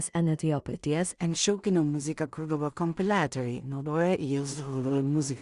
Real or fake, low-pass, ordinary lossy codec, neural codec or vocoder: fake; 10.8 kHz; Opus, 64 kbps; codec, 16 kHz in and 24 kHz out, 0.4 kbps, LongCat-Audio-Codec, two codebook decoder